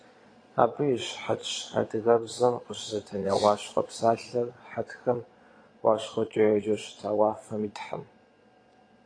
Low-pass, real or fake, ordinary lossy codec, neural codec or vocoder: 9.9 kHz; fake; AAC, 32 kbps; vocoder, 22.05 kHz, 80 mel bands, Vocos